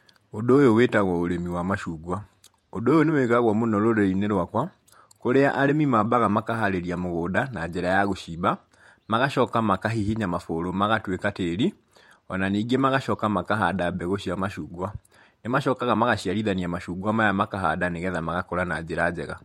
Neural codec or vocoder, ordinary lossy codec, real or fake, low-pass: vocoder, 48 kHz, 128 mel bands, Vocos; MP3, 64 kbps; fake; 19.8 kHz